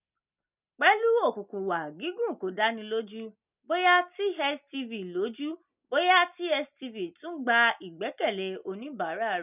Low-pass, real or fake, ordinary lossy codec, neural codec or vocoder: 3.6 kHz; real; none; none